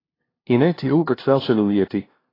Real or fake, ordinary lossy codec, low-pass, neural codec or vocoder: fake; AAC, 24 kbps; 5.4 kHz; codec, 16 kHz, 0.5 kbps, FunCodec, trained on LibriTTS, 25 frames a second